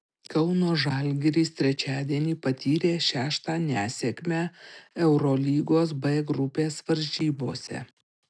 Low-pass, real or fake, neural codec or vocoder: 9.9 kHz; real; none